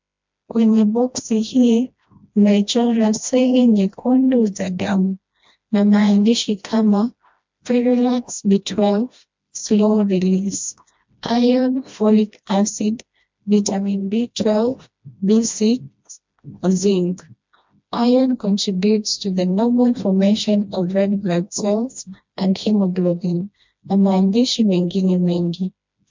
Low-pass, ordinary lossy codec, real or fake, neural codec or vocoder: 7.2 kHz; MP3, 64 kbps; fake; codec, 16 kHz, 1 kbps, FreqCodec, smaller model